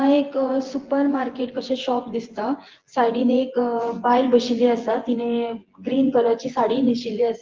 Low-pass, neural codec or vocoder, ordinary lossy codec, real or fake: 7.2 kHz; vocoder, 24 kHz, 100 mel bands, Vocos; Opus, 16 kbps; fake